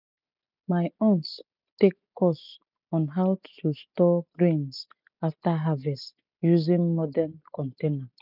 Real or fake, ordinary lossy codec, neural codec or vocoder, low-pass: real; none; none; 5.4 kHz